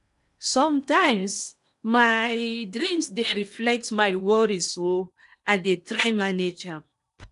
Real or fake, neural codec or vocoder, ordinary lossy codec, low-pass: fake; codec, 16 kHz in and 24 kHz out, 0.8 kbps, FocalCodec, streaming, 65536 codes; none; 10.8 kHz